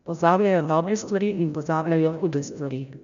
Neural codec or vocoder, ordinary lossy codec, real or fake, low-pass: codec, 16 kHz, 0.5 kbps, FreqCodec, larger model; none; fake; 7.2 kHz